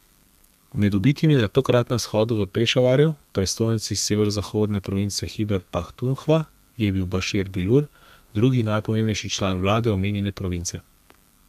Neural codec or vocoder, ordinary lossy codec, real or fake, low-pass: codec, 32 kHz, 1.9 kbps, SNAC; none; fake; 14.4 kHz